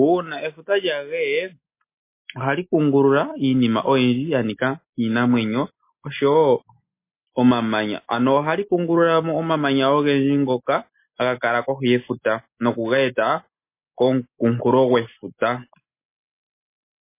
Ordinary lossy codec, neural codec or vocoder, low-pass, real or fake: MP3, 24 kbps; none; 3.6 kHz; real